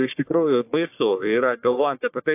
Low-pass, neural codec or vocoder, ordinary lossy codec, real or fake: 3.6 kHz; codec, 44.1 kHz, 1.7 kbps, Pupu-Codec; AAC, 32 kbps; fake